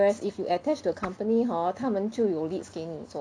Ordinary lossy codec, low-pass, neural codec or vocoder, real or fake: none; 9.9 kHz; none; real